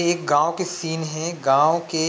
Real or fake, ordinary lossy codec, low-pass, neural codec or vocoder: real; none; none; none